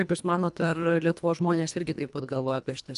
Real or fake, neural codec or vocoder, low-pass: fake; codec, 24 kHz, 1.5 kbps, HILCodec; 10.8 kHz